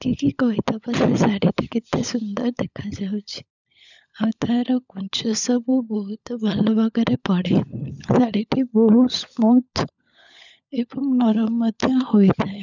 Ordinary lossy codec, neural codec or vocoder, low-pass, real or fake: none; codec, 16 kHz, 16 kbps, FunCodec, trained on LibriTTS, 50 frames a second; 7.2 kHz; fake